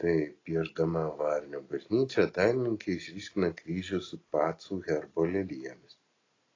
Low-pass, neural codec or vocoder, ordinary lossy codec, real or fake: 7.2 kHz; none; AAC, 32 kbps; real